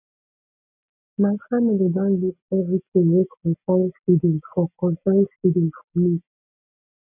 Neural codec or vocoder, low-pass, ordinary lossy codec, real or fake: none; 3.6 kHz; none; real